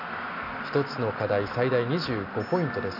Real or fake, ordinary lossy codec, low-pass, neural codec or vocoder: real; none; 5.4 kHz; none